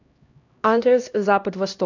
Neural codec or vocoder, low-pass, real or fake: codec, 16 kHz, 1 kbps, X-Codec, HuBERT features, trained on LibriSpeech; 7.2 kHz; fake